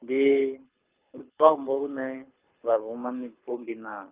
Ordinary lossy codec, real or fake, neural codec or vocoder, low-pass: Opus, 24 kbps; fake; codec, 24 kHz, 6 kbps, HILCodec; 3.6 kHz